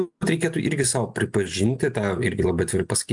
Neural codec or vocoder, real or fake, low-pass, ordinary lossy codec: none; real; 10.8 kHz; MP3, 96 kbps